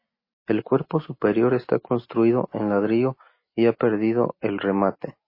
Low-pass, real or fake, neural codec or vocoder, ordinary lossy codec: 5.4 kHz; real; none; MP3, 24 kbps